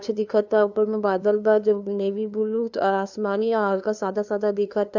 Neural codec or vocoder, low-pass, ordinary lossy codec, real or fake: codec, 16 kHz, 2 kbps, FunCodec, trained on LibriTTS, 25 frames a second; 7.2 kHz; none; fake